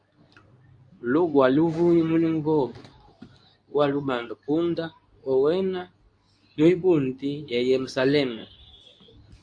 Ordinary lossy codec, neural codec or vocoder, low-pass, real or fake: AAC, 64 kbps; codec, 24 kHz, 0.9 kbps, WavTokenizer, medium speech release version 2; 9.9 kHz; fake